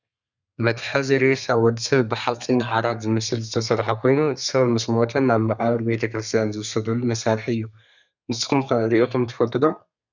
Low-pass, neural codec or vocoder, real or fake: 7.2 kHz; codec, 32 kHz, 1.9 kbps, SNAC; fake